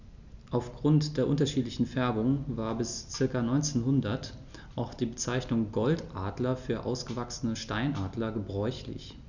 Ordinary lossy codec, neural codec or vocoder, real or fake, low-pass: none; none; real; 7.2 kHz